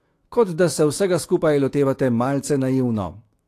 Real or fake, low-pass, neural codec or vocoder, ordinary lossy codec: fake; 14.4 kHz; autoencoder, 48 kHz, 128 numbers a frame, DAC-VAE, trained on Japanese speech; AAC, 48 kbps